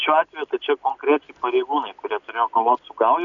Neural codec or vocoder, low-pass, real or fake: none; 7.2 kHz; real